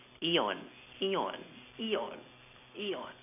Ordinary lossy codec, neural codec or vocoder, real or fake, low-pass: none; none; real; 3.6 kHz